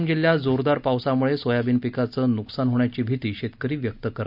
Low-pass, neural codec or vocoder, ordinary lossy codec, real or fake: 5.4 kHz; none; none; real